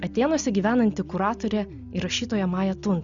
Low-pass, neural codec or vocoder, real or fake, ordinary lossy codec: 7.2 kHz; none; real; Opus, 64 kbps